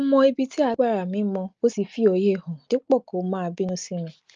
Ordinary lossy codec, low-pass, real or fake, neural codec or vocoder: Opus, 24 kbps; 7.2 kHz; real; none